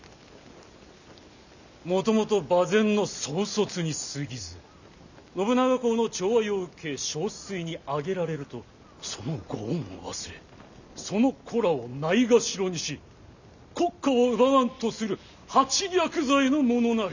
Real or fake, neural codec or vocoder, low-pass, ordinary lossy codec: real; none; 7.2 kHz; none